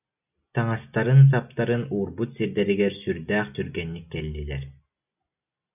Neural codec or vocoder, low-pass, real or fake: none; 3.6 kHz; real